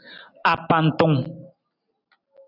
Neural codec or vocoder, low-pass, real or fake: none; 5.4 kHz; real